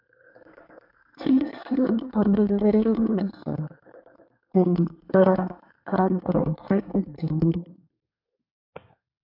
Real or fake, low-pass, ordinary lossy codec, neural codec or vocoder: fake; 5.4 kHz; MP3, 48 kbps; codec, 24 kHz, 1 kbps, SNAC